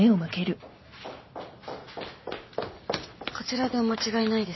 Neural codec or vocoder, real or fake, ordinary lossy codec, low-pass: none; real; MP3, 24 kbps; 7.2 kHz